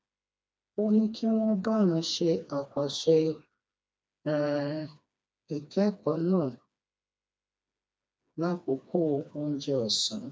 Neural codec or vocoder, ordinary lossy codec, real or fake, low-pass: codec, 16 kHz, 2 kbps, FreqCodec, smaller model; none; fake; none